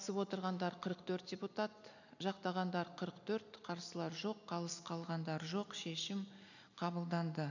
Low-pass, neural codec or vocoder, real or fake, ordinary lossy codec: 7.2 kHz; none; real; none